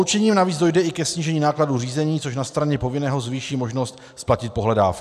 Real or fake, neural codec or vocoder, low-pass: real; none; 14.4 kHz